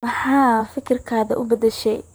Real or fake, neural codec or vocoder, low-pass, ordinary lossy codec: fake; vocoder, 44.1 kHz, 128 mel bands, Pupu-Vocoder; none; none